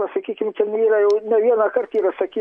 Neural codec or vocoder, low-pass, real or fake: none; 9.9 kHz; real